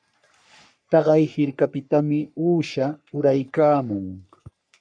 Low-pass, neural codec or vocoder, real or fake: 9.9 kHz; codec, 44.1 kHz, 3.4 kbps, Pupu-Codec; fake